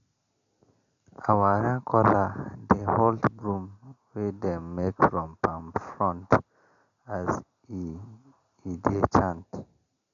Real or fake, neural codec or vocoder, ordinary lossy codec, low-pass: real; none; none; 7.2 kHz